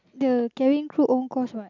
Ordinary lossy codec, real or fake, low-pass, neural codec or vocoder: none; real; 7.2 kHz; none